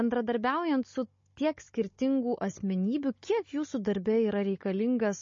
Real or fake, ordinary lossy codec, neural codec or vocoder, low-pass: fake; MP3, 32 kbps; codec, 16 kHz, 16 kbps, FunCodec, trained on Chinese and English, 50 frames a second; 7.2 kHz